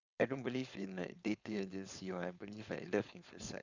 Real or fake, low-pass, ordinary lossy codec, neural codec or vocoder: fake; 7.2 kHz; Opus, 64 kbps; codec, 16 kHz, 4.8 kbps, FACodec